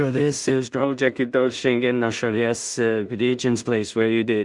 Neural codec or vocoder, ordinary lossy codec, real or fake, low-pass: codec, 16 kHz in and 24 kHz out, 0.4 kbps, LongCat-Audio-Codec, two codebook decoder; Opus, 64 kbps; fake; 10.8 kHz